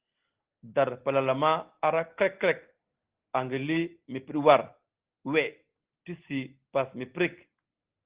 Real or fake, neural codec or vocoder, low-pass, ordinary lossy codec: real; none; 3.6 kHz; Opus, 16 kbps